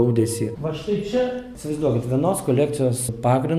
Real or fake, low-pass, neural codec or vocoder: real; 14.4 kHz; none